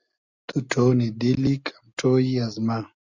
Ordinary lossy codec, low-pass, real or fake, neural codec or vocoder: Opus, 64 kbps; 7.2 kHz; real; none